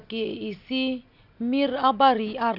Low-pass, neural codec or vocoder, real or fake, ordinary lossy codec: 5.4 kHz; none; real; none